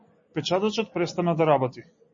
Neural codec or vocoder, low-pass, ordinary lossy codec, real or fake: none; 7.2 kHz; MP3, 32 kbps; real